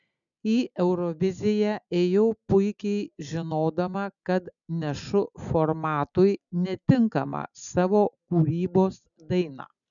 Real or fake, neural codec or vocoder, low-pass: real; none; 7.2 kHz